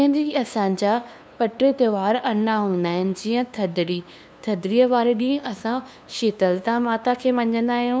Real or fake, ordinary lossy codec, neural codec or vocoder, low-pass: fake; none; codec, 16 kHz, 2 kbps, FunCodec, trained on LibriTTS, 25 frames a second; none